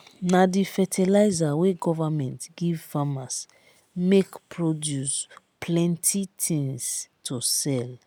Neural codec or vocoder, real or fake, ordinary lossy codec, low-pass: none; real; none; none